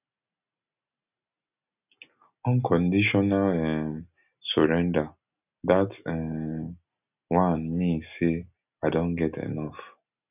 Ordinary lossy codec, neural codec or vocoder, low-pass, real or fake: none; none; 3.6 kHz; real